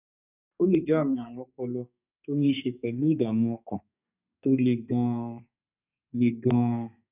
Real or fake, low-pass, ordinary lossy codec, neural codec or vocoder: fake; 3.6 kHz; none; codec, 32 kHz, 1.9 kbps, SNAC